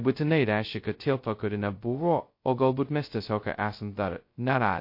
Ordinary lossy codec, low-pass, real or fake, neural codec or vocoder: MP3, 32 kbps; 5.4 kHz; fake; codec, 16 kHz, 0.2 kbps, FocalCodec